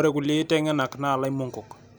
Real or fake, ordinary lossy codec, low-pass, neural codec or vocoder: real; none; none; none